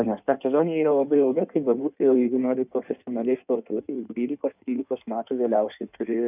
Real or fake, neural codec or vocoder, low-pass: fake; codec, 16 kHz in and 24 kHz out, 1.1 kbps, FireRedTTS-2 codec; 3.6 kHz